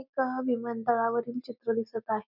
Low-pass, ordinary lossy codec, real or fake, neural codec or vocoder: 5.4 kHz; none; real; none